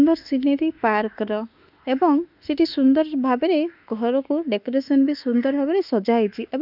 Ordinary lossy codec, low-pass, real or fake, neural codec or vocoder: none; 5.4 kHz; fake; autoencoder, 48 kHz, 32 numbers a frame, DAC-VAE, trained on Japanese speech